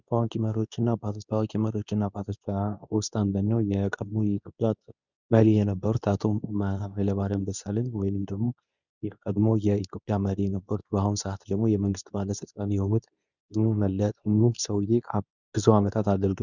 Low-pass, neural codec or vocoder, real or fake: 7.2 kHz; codec, 24 kHz, 0.9 kbps, WavTokenizer, medium speech release version 2; fake